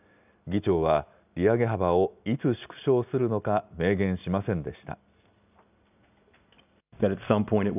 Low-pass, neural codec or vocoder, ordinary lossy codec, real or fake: 3.6 kHz; none; none; real